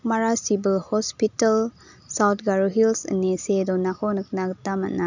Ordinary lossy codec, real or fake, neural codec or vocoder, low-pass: none; real; none; 7.2 kHz